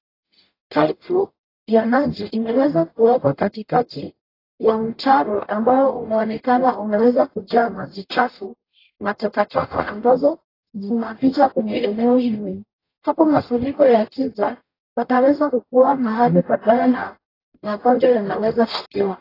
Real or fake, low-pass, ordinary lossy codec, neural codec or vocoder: fake; 5.4 kHz; AAC, 24 kbps; codec, 44.1 kHz, 0.9 kbps, DAC